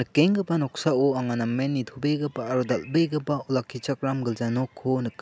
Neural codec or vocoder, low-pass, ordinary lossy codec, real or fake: none; none; none; real